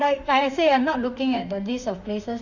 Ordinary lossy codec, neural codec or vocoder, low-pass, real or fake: none; autoencoder, 48 kHz, 32 numbers a frame, DAC-VAE, trained on Japanese speech; 7.2 kHz; fake